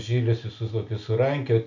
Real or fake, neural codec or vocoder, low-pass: real; none; 7.2 kHz